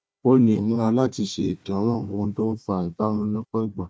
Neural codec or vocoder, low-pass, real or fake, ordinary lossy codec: codec, 16 kHz, 1 kbps, FunCodec, trained on Chinese and English, 50 frames a second; none; fake; none